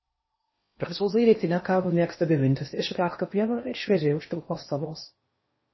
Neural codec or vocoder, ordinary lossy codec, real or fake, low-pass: codec, 16 kHz in and 24 kHz out, 0.6 kbps, FocalCodec, streaming, 4096 codes; MP3, 24 kbps; fake; 7.2 kHz